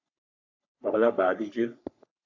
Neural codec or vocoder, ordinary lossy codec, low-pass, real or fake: codec, 44.1 kHz, 3.4 kbps, Pupu-Codec; AAC, 48 kbps; 7.2 kHz; fake